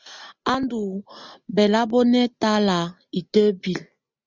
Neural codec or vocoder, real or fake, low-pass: none; real; 7.2 kHz